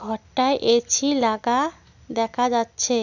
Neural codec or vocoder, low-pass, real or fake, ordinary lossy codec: none; 7.2 kHz; real; none